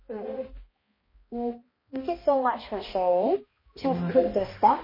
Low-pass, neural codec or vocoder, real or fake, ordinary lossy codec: 5.4 kHz; codec, 16 kHz, 1 kbps, X-Codec, HuBERT features, trained on balanced general audio; fake; MP3, 24 kbps